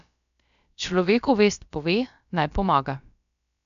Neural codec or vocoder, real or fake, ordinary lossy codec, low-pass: codec, 16 kHz, about 1 kbps, DyCAST, with the encoder's durations; fake; none; 7.2 kHz